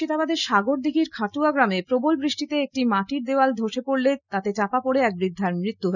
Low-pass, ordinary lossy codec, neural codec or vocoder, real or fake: 7.2 kHz; none; none; real